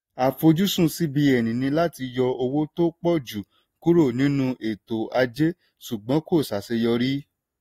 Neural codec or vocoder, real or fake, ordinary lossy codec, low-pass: none; real; AAC, 48 kbps; 19.8 kHz